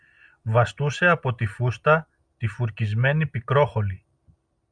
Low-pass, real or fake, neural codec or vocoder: 9.9 kHz; real; none